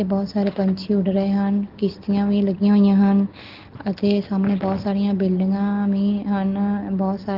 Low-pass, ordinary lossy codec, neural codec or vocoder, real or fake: 5.4 kHz; Opus, 16 kbps; none; real